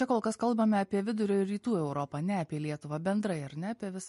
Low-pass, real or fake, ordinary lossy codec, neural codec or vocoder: 14.4 kHz; real; MP3, 48 kbps; none